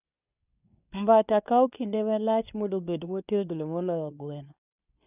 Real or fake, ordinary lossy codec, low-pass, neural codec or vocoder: fake; none; 3.6 kHz; codec, 24 kHz, 0.9 kbps, WavTokenizer, medium speech release version 2